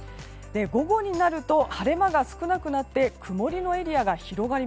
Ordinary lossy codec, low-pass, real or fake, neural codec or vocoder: none; none; real; none